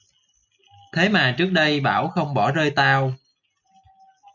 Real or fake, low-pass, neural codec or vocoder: real; 7.2 kHz; none